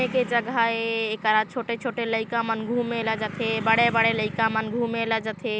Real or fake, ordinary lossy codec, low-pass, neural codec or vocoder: real; none; none; none